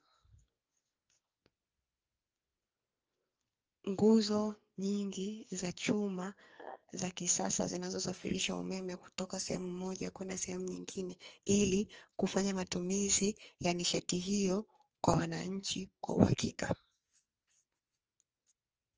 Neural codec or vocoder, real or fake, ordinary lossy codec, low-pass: codec, 44.1 kHz, 2.6 kbps, SNAC; fake; Opus, 24 kbps; 7.2 kHz